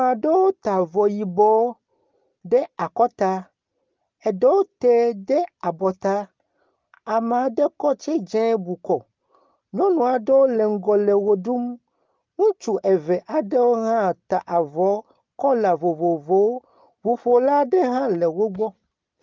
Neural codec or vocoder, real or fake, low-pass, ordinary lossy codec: none; real; 7.2 kHz; Opus, 32 kbps